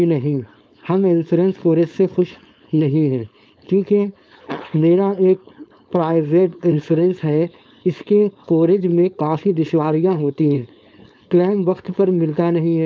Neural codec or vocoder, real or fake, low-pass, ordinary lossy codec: codec, 16 kHz, 4.8 kbps, FACodec; fake; none; none